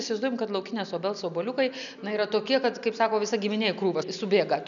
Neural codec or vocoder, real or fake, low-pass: none; real; 7.2 kHz